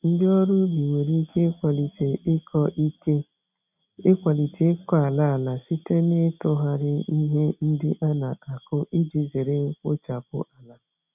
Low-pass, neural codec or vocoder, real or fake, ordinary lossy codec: 3.6 kHz; none; real; none